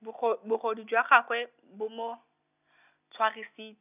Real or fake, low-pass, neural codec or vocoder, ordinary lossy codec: real; 3.6 kHz; none; none